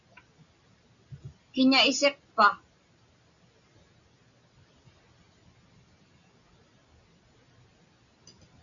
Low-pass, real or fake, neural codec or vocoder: 7.2 kHz; real; none